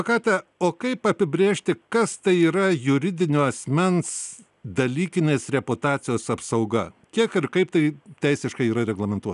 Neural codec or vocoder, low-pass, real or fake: none; 10.8 kHz; real